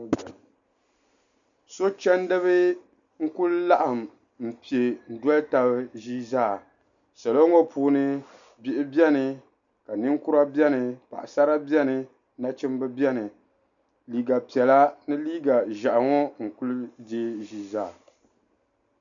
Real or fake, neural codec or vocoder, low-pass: real; none; 7.2 kHz